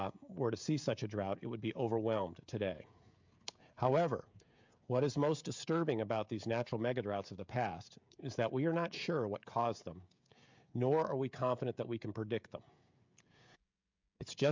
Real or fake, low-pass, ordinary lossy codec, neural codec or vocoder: fake; 7.2 kHz; MP3, 64 kbps; codec, 16 kHz, 16 kbps, FreqCodec, smaller model